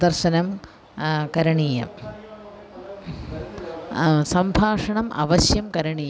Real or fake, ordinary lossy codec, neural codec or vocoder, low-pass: real; none; none; none